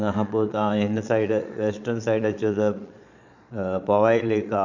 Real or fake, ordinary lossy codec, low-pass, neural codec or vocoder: fake; none; 7.2 kHz; vocoder, 22.05 kHz, 80 mel bands, Vocos